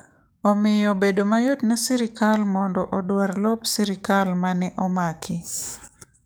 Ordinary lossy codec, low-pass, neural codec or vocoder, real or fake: none; none; codec, 44.1 kHz, 7.8 kbps, DAC; fake